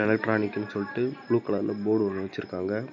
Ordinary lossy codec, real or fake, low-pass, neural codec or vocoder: none; real; 7.2 kHz; none